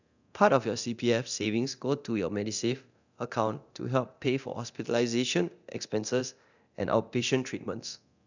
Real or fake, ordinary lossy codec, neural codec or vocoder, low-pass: fake; none; codec, 24 kHz, 0.9 kbps, DualCodec; 7.2 kHz